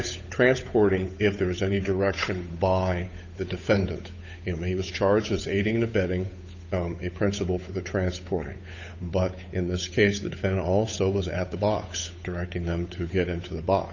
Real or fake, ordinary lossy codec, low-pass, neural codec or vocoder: fake; MP3, 64 kbps; 7.2 kHz; codec, 16 kHz, 8 kbps, FunCodec, trained on Chinese and English, 25 frames a second